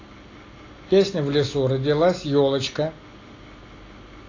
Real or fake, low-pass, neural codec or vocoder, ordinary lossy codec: real; 7.2 kHz; none; AAC, 32 kbps